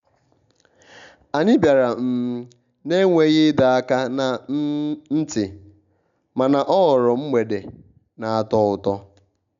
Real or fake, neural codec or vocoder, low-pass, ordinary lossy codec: real; none; 7.2 kHz; none